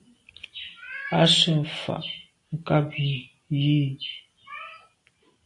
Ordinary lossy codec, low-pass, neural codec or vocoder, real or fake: AAC, 64 kbps; 10.8 kHz; none; real